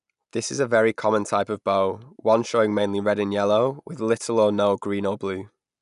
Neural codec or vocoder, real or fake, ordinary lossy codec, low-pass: none; real; none; 10.8 kHz